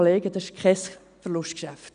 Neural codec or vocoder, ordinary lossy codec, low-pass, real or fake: none; none; 9.9 kHz; real